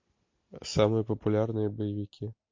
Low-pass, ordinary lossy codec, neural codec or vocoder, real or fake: 7.2 kHz; MP3, 48 kbps; none; real